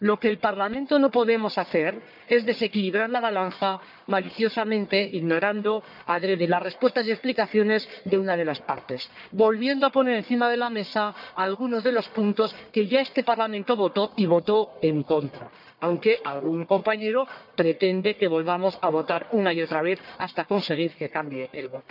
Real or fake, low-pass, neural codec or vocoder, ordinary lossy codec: fake; 5.4 kHz; codec, 44.1 kHz, 1.7 kbps, Pupu-Codec; none